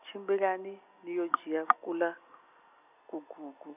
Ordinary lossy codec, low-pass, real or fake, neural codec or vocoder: none; 3.6 kHz; real; none